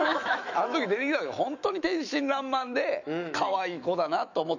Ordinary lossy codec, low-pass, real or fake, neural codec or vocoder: none; 7.2 kHz; fake; autoencoder, 48 kHz, 128 numbers a frame, DAC-VAE, trained on Japanese speech